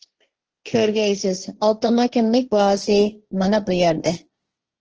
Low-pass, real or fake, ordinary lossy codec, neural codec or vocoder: 7.2 kHz; fake; Opus, 16 kbps; codec, 16 kHz, 1.1 kbps, Voila-Tokenizer